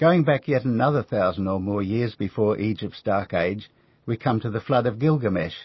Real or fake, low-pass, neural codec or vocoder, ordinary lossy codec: real; 7.2 kHz; none; MP3, 24 kbps